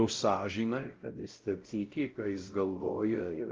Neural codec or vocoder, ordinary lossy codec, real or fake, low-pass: codec, 16 kHz, 0.5 kbps, X-Codec, WavLM features, trained on Multilingual LibriSpeech; Opus, 32 kbps; fake; 7.2 kHz